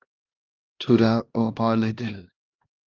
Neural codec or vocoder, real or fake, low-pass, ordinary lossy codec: codec, 16 kHz, 1 kbps, X-Codec, HuBERT features, trained on LibriSpeech; fake; 7.2 kHz; Opus, 32 kbps